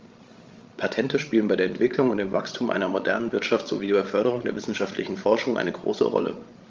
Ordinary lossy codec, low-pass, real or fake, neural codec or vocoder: Opus, 32 kbps; 7.2 kHz; fake; codec, 16 kHz, 16 kbps, FreqCodec, larger model